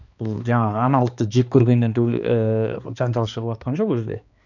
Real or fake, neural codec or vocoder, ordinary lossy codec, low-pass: fake; codec, 16 kHz, 2 kbps, X-Codec, HuBERT features, trained on balanced general audio; none; 7.2 kHz